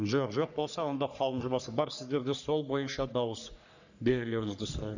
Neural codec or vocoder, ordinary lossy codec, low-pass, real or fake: codec, 44.1 kHz, 3.4 kbps, Pupu-Codec; none; 7.2 kHz; fake